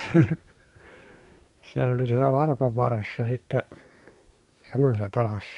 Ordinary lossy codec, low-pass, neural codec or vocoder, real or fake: none; 10.8 kHz; codec, 24 kHz, 1 kbps, SNAC; fake